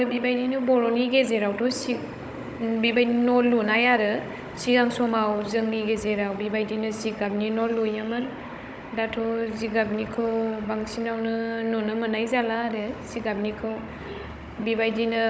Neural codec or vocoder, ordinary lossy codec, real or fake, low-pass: codec, 16 kHz, 16 kbps, FunCodec, trained on Chinese and English, 50 frames a second; none; fake; none